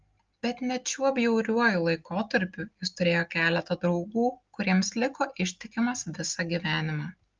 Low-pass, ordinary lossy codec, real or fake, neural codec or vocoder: 7.2 kHz; Opus, 32 kbps; real; none